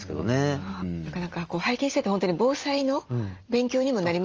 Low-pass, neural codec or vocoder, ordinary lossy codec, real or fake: 7.2 kHz; none; Opus, 24 kbps; real